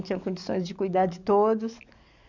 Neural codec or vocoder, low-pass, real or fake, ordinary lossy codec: codec, 44.1 kHz, 7.8 kbps, DAC; 7.2 kHz; fake; none